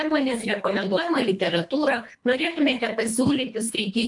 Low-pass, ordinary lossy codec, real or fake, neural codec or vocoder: 10.8 kHz; MP3, 64 kbps; fake; codec, 24 kHz, 1.5 kbps, HILCodec